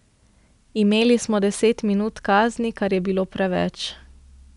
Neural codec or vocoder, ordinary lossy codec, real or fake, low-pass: none; none; real; 10.8 kHz